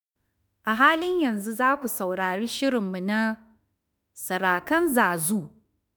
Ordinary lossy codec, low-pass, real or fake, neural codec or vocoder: none; none; fake; autoencoder, 48 kHz, 32 numbers a frame, DAC-VAE, trained on Japanese speech